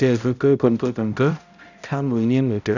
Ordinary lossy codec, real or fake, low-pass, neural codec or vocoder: none; fake; 7.2 kHz; codec, 16 kHz, 0.5 kbps, X-Codec, HuBERT features, trained on balanced general audio